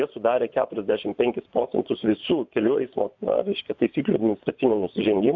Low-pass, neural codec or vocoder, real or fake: 7.2 kHz; vocoder, 24 kHz, 100 mel bands, Vocos; fake